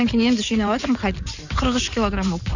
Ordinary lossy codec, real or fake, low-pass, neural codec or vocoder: none; fake; 7.2 kHz; codec, 16 kHz in and 24 kHz out, 2.2 kbps, FireRedTTS-2 codec